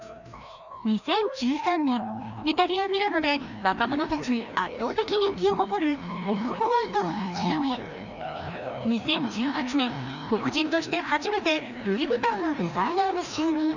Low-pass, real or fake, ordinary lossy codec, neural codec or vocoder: 7.2 kHz; fake; none; codec, 16 kHz, 1 kbps, FreqCodec, larger model